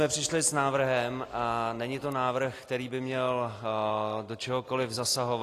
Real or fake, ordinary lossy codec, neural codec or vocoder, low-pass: real; AAC, 48 kbps; none; 14.4 kHz